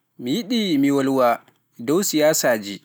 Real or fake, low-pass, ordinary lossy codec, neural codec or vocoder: real; none; none; none